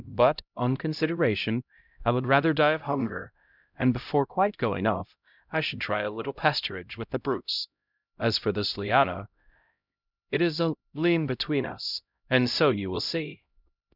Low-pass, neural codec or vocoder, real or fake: 5.4 kHz; codec, 16 kHz, 0.5 kbps, X-Codec, HuBERT features, trained on LibriSpeech; fake